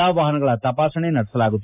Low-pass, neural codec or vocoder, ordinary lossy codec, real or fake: 3.6 kHz; none; AAC, 32 kbps; real